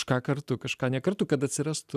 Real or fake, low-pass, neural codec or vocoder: real; 14.4 kHz; none